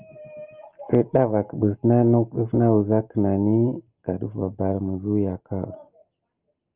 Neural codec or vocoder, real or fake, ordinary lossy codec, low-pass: none; real; Opus, 24 kbps; 3.6 kHz